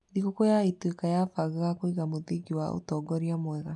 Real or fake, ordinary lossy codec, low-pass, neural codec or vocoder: real; none; 10.8 kHz; none